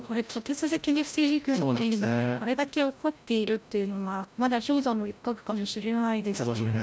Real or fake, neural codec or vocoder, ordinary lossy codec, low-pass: fake; codec, 16 kHz, 0.5 kbps, FreqCodec, larger model; none; none